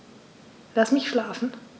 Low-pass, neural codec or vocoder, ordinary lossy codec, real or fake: none; none; none; real